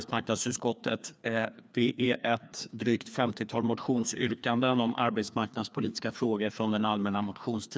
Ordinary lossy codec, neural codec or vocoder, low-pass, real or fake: none; codec, 16 kHz, 2 kbps, FreqCodec, larger model; none; fake